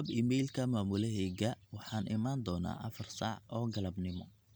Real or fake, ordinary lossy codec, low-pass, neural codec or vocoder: real; none; none; none